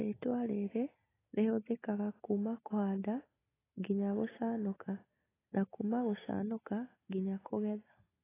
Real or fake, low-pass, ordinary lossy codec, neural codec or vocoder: real; 3.6 kHz; AAC, 16 kbps; none